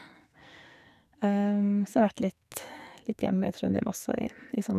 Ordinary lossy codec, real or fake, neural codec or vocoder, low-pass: none; fake; codec, 44.1 kHz, 2.6 kbps, SNAC; 14.4 kHz